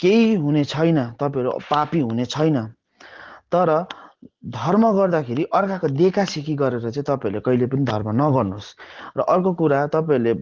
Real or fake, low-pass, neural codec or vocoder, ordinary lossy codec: real; 7.2 kHz; none; Opus, 16 kbps